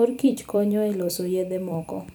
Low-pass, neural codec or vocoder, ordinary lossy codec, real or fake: none; vocoder, 44.1 kHz, 128 mel bands every 256 samples, BigVGAN v2; none; fake